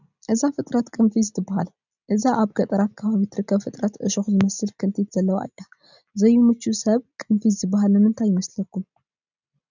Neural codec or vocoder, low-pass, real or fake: none; 7.2 kHz; real